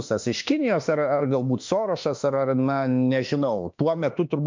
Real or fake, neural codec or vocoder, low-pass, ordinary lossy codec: fake; autoencoder, 48 kHz, 32 numbers a frame, DAC-VAE, trained on Japanese speech; 7.2 kHz; MP3, 64 kbps